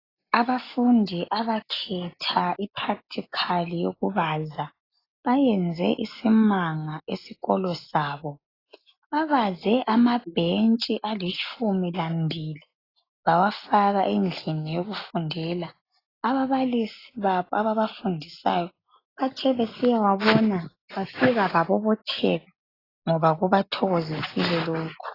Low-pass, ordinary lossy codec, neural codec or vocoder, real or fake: 5.4 kHz; AAC, 24 kbps; none; real